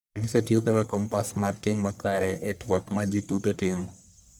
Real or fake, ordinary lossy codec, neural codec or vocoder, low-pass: fake; none; codec, 44.1 kHz, 1.7 kbps, Pupu-Codec; none